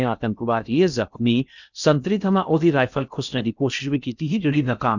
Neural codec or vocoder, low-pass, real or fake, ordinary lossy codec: codec, 16 kHz in and 24 kHz out, 0.6 kbps, FocalCodec, streaming, 4096 codes; 7.2 kHz; fake; none